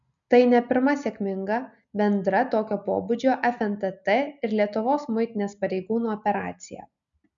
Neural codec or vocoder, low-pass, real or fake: none; 7.2 kHz; real